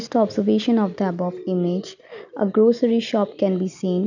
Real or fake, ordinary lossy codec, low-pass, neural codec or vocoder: real; none; 7.2 kHz; none